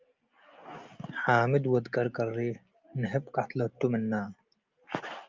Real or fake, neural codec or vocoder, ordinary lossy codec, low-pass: real; none; Opus, 24 kbps; 7.2 kHz